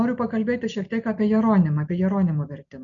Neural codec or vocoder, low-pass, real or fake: none; 7.2 kHz; real